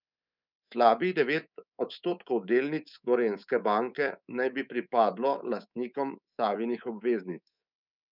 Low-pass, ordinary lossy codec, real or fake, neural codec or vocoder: 5.4 kHz; none; fake; codec, 24 kHz, 3.1 kbps, DualCodec